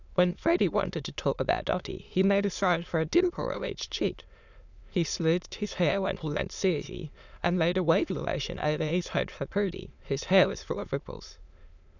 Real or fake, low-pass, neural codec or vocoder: fake; 7.2 kHz; autoencoder, 22.05 kHz, a latent of 192 numbers a frame, VITS, trained on many speakers